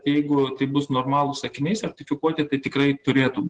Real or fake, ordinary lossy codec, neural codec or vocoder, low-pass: real; Opus, 16 kbps; none; 9.9 kHz